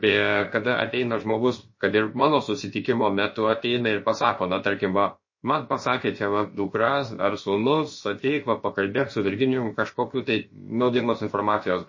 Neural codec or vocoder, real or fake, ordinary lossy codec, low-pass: codec, 16 kHz, about 1 kbps, DyCAST, with the encoder's durations; fake; MP3, 32 kbps; 7.2 kHz